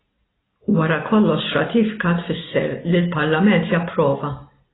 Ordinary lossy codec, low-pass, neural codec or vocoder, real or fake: AAC, 16 kbps; 7.2 kHz; none; real